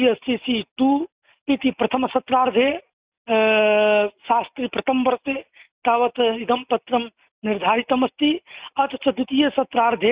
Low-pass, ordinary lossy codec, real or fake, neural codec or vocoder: 3.6 kHz; Opus, 64 kbps; real; none